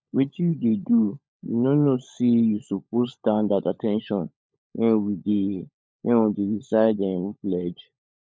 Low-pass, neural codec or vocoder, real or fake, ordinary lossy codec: none; codec, 16 kHz, 16 kbps, FunCodec, trained on LibriTTS, 50 frames a second; fake; none